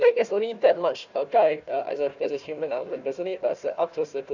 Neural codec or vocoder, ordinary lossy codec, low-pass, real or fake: codec, 16 kHz, 1 kbps, FunCodec, trained on Chinese and English, 50 frames a second; none; 7.2 kHz; fake